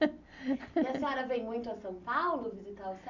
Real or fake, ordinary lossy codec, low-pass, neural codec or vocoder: real; none; 7.2 kHz; none